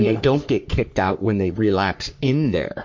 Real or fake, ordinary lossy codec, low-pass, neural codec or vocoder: fake; MP3, 48 kbps; 7.2 kHz; codec, 44.1 kHz, 3.4 kbps, Pupu-Codec